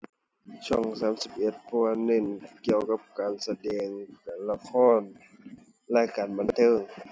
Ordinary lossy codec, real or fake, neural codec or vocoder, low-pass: none; real; none; none